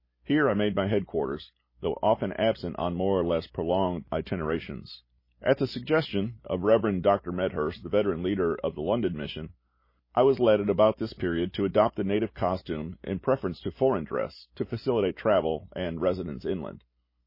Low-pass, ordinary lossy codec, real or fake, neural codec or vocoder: 5.4 kHz; MP3, 24 kbps; real; none